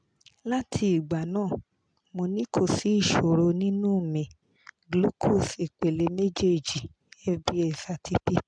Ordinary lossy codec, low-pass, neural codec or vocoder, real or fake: MP3, 96 kbps; 9.9 kHz; none; real